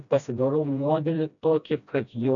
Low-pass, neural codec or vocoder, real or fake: 7.2 kHz; codec, 16 kHz, 1 kbps, FreqCodec, smaller model; fake